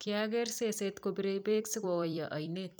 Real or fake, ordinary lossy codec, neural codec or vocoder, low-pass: fake; none; vocoder, 44.1 kHz, 128 mel bands every 256 samples, BigVGAN v2; none